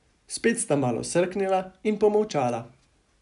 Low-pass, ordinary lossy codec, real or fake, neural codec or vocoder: 10.8 kHz; none; real; none